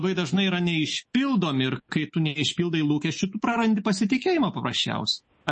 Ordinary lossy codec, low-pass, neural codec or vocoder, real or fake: MP3, 32 kbps; 10.8 kHz; autoencoder, 48 kHz, 128 numbers a frame, DAC-VAE, trained on Japanese speech; fake